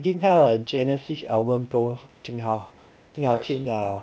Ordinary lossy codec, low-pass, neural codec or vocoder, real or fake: none; none; codec, 16 kHz, 0.8 kbps, ZipCodec; fake